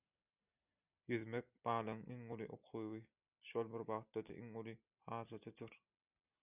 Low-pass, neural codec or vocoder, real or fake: 3.6 kHz; none; real